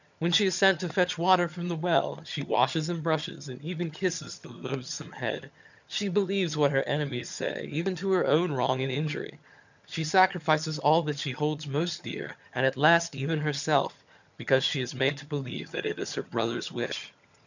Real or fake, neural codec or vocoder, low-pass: fake; vocoder, 22.05 kHz, 80 mel bands, HiFi-GAN; 7.2 kHz